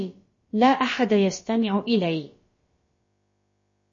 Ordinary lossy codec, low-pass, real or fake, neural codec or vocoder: MP3, 32 kbps; 7.2 kHz; fake; codec, 16 kHz, about 1 kbps, DyCAST, with the encoder's durations